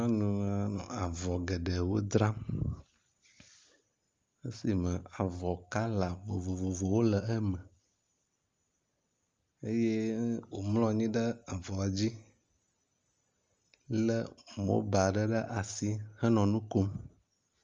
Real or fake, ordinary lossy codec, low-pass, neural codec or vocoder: real; Opus, 32 kbps; 7.2 kHz; none